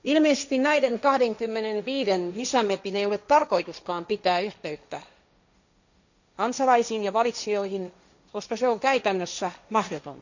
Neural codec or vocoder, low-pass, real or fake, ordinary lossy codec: codec, 16 kHz, 1.1 kbps, Voila-Tokenizer; 7.2 kHz; fake; none